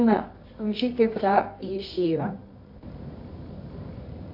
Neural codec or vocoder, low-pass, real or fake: codec, 24 kHz, 0.9 kbps, WavTokenizer, medium music audio release; 5.4 kHz; fake